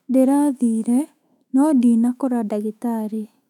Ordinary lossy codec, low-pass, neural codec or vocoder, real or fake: none; 19.8 kHz; autoencoder, 48 kHz, 128 numbers a frame, DAC-VAE, trained on Japanese speech; fake